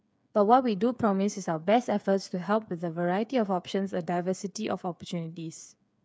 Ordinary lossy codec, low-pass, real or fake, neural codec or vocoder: none; none; fake; codec, 16 kHz, 8 kbps, FreqCodec, smaller model